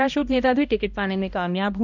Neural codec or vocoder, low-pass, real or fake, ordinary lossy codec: codec, 16 kHz, 1 kbps, X-Codec, HuBERT features, trained on balanced general audio; 7.2 kHz; fake; none